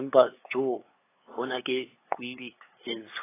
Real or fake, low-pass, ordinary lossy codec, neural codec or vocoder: fake; 3.6 kHz; AAC, 16 kbps; codec, 16 kHz, 8 kbps, FunCodec, trained on LibriTTS, 25 frames a second